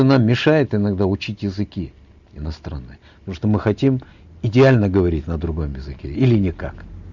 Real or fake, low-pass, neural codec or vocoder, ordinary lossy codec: real; 7.2 kHz; none; none